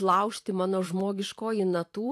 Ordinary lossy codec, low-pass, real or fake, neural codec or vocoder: AAC, 64 kbps; 14.4 kHz; fake; vocoder, 44.1 kHz, 128 mel bands every 512 samples, BigVGAN v2